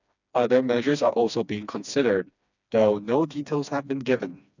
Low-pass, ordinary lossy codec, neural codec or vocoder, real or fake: 7.2 kHz; none; codec, 16 kHz, 2 kbps, FreqCodec, smaller model; fake